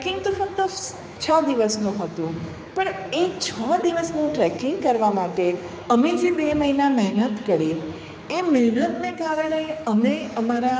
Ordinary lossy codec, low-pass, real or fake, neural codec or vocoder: none; none; fake; codec, 16 kHz, 4 kbps, X-Codec, HuBERT features, trained on general audio